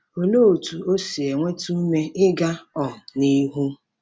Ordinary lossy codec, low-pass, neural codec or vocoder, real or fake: none; none; none; real